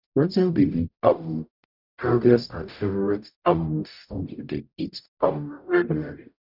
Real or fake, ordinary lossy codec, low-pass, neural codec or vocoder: fake; none; 5.4 kHz; codec, 44.1 kHz, 0.9 kbps, DAC